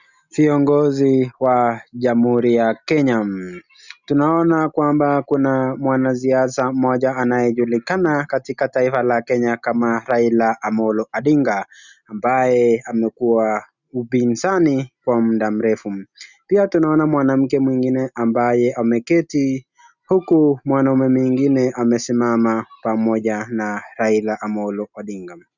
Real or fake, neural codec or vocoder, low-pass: real; none; 7.2 kHz